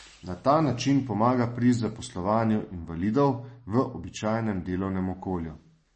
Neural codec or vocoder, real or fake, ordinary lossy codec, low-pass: none; real; MP3, 32 kbps; 10.8 kHz